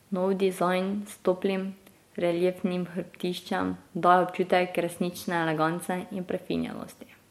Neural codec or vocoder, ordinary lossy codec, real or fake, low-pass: none; MP3, 64 kbps; real; 19.8 kHz